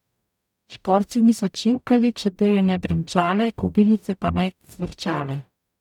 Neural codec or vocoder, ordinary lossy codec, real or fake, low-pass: codec, 44.1 kHz, 0.9 kbps, DAC; none; fake; 19.8 kHz